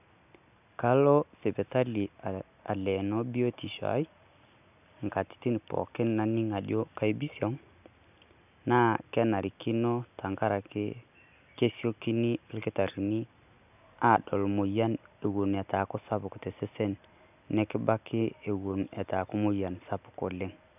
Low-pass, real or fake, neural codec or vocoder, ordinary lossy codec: 3.6 kHz; real; none; none